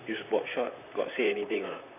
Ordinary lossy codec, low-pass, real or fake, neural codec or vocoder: none; 3.6 kHz; fake; vocoder, 44.1 kHz, 128 mel bands, Pupu-Vocoder